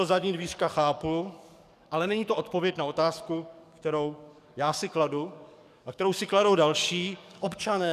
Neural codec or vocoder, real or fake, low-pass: codec, 44.1 kHz, 7.8 kbps, DAC; fake; 14.4 kHz